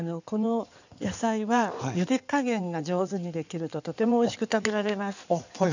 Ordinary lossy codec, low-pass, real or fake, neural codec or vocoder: none; 7.2 kHz; fake; codec, 16 kHz in and 24 kHz out, 2.2 kbps, FireRedTTS-2 codec